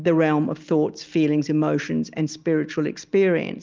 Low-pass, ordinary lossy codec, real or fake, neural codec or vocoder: 7.2 kHz; Opus, 32 kbps; real; none